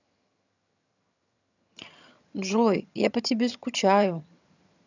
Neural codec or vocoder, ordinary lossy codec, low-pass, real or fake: vocoder, 22.05 kHz, 80 mel bands, HiFi-GAN; none; 7.2 kHz; fake